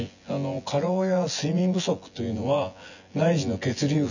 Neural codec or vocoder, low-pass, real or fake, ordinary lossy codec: vocoder, 24 kHz, 100 mel bands, Vocos; 7.2 kHz; fake; none